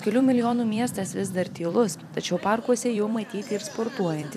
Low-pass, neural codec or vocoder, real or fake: 14.4 kHz; vocoder, 44.1 kHz, 128 mel bands every 256 samples, BigVGAN v2; fake